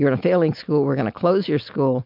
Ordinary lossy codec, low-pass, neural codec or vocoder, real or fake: MP3, 48 kbps; 5.4 kHz; none; real